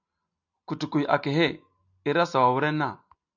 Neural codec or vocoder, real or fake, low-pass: none; real; 7.2 kHz